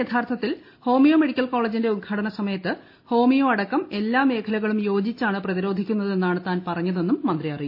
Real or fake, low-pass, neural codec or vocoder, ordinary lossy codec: real; 5.4 kHz; none; MP3, 32 kbps